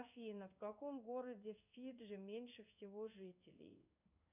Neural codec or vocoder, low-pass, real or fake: codec, 16 kHz in and 24 kHz out, 1 kbps, XY-Tokenizer; 3.6 kHz; fake